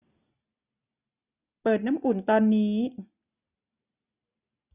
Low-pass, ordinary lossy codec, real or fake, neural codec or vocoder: 3.6 kHz; none; real; none